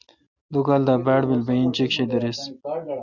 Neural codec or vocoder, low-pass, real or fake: none; 7.2 kHz; real